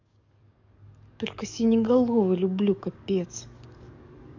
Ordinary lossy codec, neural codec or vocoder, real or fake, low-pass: none; codec, 44.1 kHz, 7.8 kbps, DAC; fake; 7.2 kHz